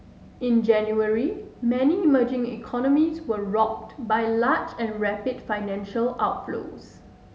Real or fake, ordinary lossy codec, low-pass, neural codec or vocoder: real; none; none; none